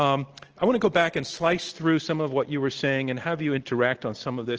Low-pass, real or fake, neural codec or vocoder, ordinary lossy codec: 7.2 kHz; real; none; Opus, 16 kbps